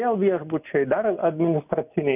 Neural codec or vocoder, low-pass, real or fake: none; 3.6 kHz; real